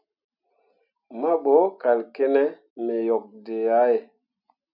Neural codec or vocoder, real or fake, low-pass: none; real; 5.4 kHz